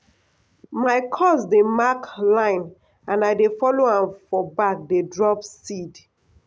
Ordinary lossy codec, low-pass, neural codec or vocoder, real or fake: none; none; none; real